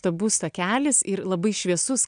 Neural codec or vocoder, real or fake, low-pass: none; real; 9.9 kHz